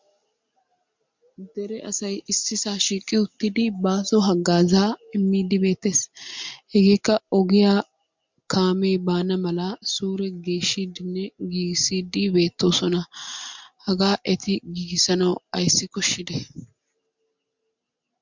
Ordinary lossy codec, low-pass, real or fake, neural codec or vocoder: MP3, 64 kbps; 7.2 kHz; real; none